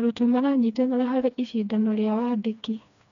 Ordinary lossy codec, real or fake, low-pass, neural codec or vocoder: none; fake; 7.2 kHz; codec, 16 kHz, 2 kbps, FreqCodec, smaller model